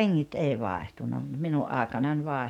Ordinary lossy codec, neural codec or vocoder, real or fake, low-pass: none; codec, 44.1 kHz, 7.8 kbps, DAC; fake; 19.8 kHz